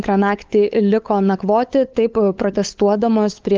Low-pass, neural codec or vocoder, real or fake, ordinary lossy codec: 7.2 kHz; codec, 16 kHz, 8 kbps, FunCodec, trained on LibriTTS, 25 frames a second; fake; Opus, 32 kbps